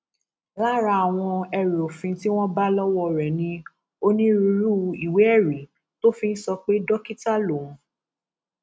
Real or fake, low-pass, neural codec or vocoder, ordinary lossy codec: real; none; none; none